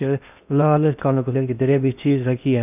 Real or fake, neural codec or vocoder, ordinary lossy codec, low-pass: fake; codec, 16 kHz in and 24 kHz out, 0.6 kbps, FocalCodec, streaming, 4096 codes; none; 3.6 kHz